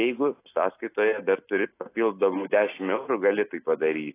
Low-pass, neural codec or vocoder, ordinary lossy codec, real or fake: 3.6 kHz; none; AAC, 24 kbps; real